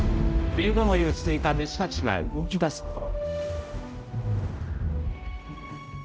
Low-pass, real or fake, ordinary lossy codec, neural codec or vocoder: none; fake; none; codec, 16 kHz, 0.5 kbps, X-Codec, HuBERT features, trained on general audio